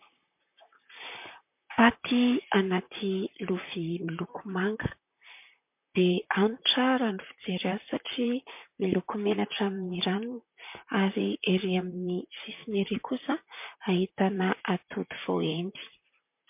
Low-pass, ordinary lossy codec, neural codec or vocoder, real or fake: 3.6 kHz; MP3, 32 kbps; vocoder, 44.1 kHz, 128 mel bands, Pupu-Vocoder; fake